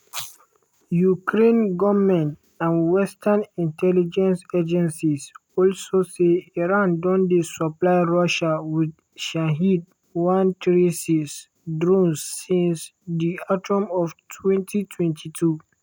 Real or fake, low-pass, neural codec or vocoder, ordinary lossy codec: real; 19.8 kHz; none; none